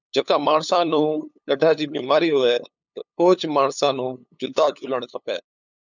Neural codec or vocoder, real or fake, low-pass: codec, 16 kHz, 8 kbps, FunCodec, trained on LibriTTS, 25 frames a second; fake; 7.2 kHz